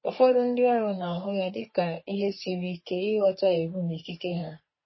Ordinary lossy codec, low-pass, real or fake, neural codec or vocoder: MP3, 24 kbps; 7.2 kHz; fake; codec, 44.1 kHz, 3.4 kbps, Pupu-Codec